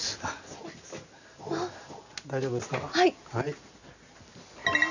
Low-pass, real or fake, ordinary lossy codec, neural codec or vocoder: 7.2 kHz; real; none; none